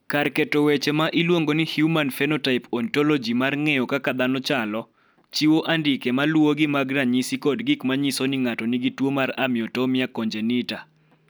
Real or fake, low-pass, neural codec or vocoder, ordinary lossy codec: real; none; none; none